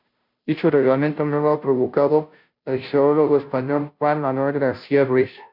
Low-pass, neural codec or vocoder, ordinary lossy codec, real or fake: 5.4 kHz; codec, 16 kHz, 0.5 kbps, FunCodec, trained on Chinese and English, 25 frames a second; MP3, 48 kbps; fake